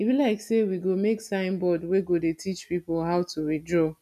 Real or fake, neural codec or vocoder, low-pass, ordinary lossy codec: real; none; 14.4 kHz; none